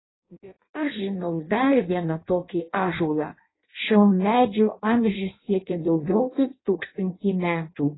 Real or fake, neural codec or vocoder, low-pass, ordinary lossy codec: fake; codec, 16 kHz in and 24 kHz out, 0.6 kbps, FireRedTTS-2 codec; 7.2 kHz; AAC, 16 kbps